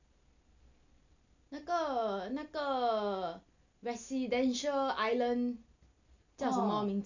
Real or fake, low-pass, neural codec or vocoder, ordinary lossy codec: real; 7.2 kHz; none; none